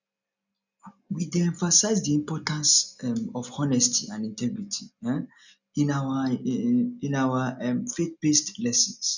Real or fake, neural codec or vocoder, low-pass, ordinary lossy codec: real; none; 7.2 kHz; none